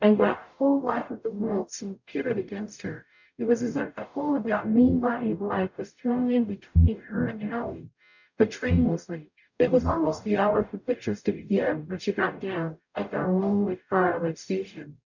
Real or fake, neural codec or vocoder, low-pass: fake; codec, 44.1 kHz, 0.9 kbps, DAC; 7.2 kHz